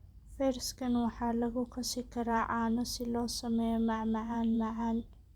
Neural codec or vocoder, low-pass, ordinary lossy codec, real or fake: vocoder, 44.1 kHz, 128 mel bands every 512 samples, BigVGAN v2; 19.8 kHz; Opus, 64 kbps; fake